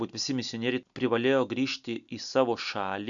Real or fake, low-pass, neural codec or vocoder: real; 7.2 kHz; none